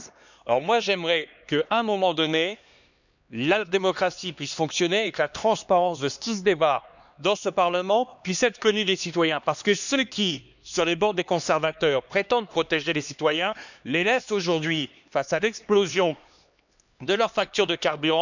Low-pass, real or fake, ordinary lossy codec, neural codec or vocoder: 7.2 kHz; fake; none; codec, 16 kHz, 2 kbps, X-Codec, HuBERT features, trained on LibriSpeech